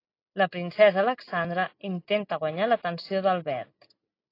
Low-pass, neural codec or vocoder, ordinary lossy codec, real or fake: 5.4 kHz; none; AAC, 32 kbps; real